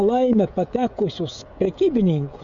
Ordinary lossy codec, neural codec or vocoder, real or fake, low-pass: MP3, 64 kbps; codec, 16 kHz, 16 kbps, FreqCodec, smaller model; fake; 7.2 kHz